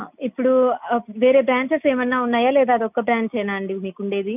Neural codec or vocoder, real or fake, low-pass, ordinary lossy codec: none; real; 3.6 kHz; none